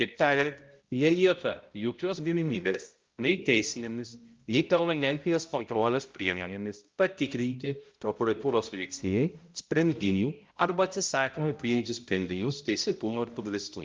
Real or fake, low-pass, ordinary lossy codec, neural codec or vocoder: fake; 7.2 kHz; Opus, 32 kbps; codec, 16 kHz, 0.5 kbps, X-Codec, HuBERT features, trained on balanced general audio